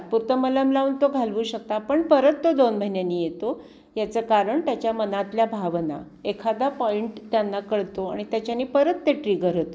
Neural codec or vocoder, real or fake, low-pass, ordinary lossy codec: none; real; none; none